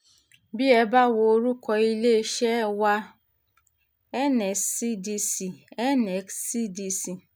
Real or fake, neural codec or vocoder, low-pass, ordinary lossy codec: real; none; none; none